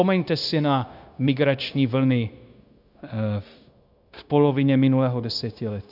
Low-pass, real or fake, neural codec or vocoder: 5.4 kHz; fake; codec, 16 kHz, 0.9 kbps, LongCat-Audio-Codec